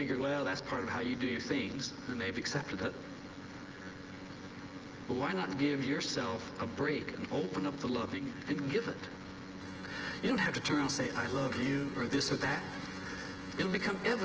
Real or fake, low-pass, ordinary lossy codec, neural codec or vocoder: fake; 7.2 kHz; Opus, 16 kbps; vocoder, 24 kHz, 100 mel bands, Vocos